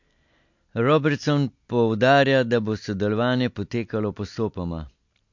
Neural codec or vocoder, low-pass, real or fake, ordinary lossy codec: none; 7.2 kHz; real; MP3, 48 kbps